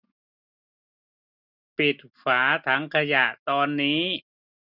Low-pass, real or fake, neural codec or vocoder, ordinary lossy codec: 5.4 kHz; real; none; none